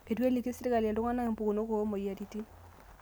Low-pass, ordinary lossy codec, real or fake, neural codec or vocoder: none; none; real; none